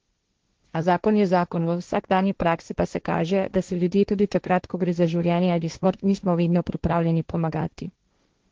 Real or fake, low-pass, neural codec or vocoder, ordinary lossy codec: fake; 7.2 kHz; codec, 16 kHz, 1.1 kbps, Voila-Tokenizer; Opus, 16 kbps